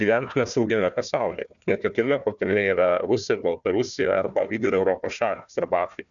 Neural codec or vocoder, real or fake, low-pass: codec, 16 kHz, 1 kbps, FunCodec, trained on Chinese and English, 50 frames a second; fake; 7.2 kHz